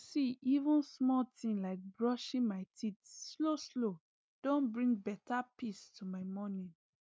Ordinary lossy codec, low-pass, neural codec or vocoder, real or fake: none; none; none; real